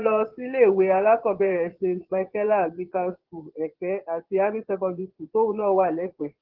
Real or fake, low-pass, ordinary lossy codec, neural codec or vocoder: fake; 5.4 kHz; Opus, 16 kbps; vocoder, 22.05 kHz, 80 mel bands, Vocos